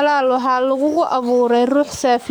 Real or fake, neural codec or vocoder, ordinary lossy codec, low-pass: fake; codec, 44.1 kHz, 7.8 kbps, DAC; none; none